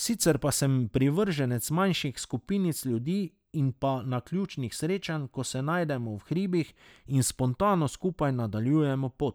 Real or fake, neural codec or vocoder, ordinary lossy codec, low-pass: real; none; none; none